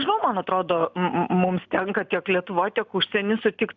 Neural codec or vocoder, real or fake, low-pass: none; real; 7.2 kHz